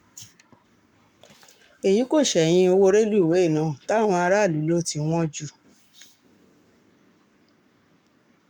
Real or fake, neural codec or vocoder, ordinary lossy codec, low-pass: fake; codec, 44.1 kHz, 7.8 kbps, Pupu-Codec; none; 19.8 kHz